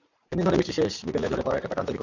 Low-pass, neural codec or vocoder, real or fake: 7.2 kHz; vocoder, 44.1 kHz, 80 mel bands, Vocos; fake